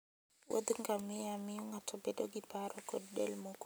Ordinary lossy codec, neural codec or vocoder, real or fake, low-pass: none; none; real; none